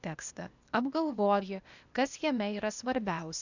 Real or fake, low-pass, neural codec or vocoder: fake; 7.2 kHz; codec, 16 kHz, 0.8 kbps, ZipCodec